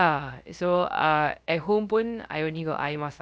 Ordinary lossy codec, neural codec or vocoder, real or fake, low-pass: none; codec, 16 kHz, 0.3 kbps, FocalCodec; fake; none